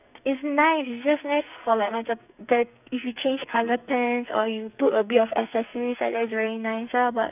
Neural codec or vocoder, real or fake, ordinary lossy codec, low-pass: codec, 44.1 kHz, 2.6 kbps, SNAC; fake; none; 3.6 kHz